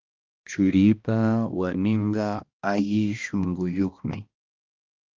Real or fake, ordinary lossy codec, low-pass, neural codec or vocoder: fake; Opus, 32 kbps; 7.2 kHz; codec, 16 kHz, 1 kbps, X-Codec, HuBERT features, trained on balanced general audio